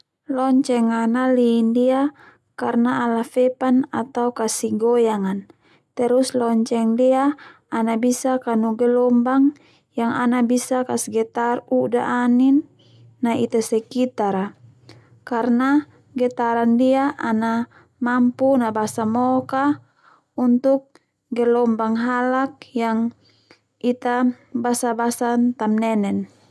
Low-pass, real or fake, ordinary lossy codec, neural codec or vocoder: none; real; none; none